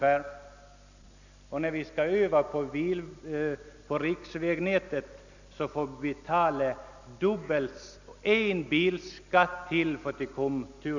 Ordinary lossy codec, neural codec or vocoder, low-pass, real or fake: none; none; 7.2 kHz; real